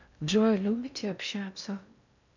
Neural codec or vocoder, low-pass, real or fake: codec, 16 kHz in and 24 kHz out, 0.6 kbps, FocalCodec, streaming, 4096 codes; 7.2 kHz; fake